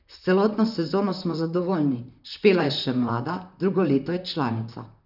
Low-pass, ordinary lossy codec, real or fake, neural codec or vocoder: 5.4 kHz; none; fake; vocoder, 44.1 kHz, 128 mel bands, Pupu-Vocoder